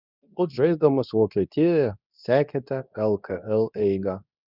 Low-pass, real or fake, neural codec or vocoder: 5.4 kHz; fake; codec, 24 kHz, 0.9 kbps, WavTokenizer, medium speech release version 2